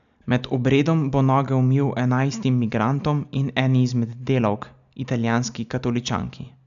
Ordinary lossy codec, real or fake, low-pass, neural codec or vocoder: none; real; 7.2 kHz; none